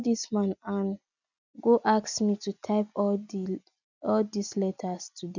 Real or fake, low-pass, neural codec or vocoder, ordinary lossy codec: real; 7.2 kHz; none; none